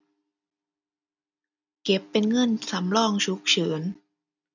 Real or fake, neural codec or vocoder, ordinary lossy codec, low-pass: real; none; none; 7.2 kHz